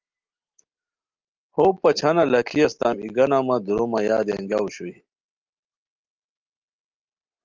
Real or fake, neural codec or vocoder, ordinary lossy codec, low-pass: real; none; Opus, 24 kbps; 7.2 kHz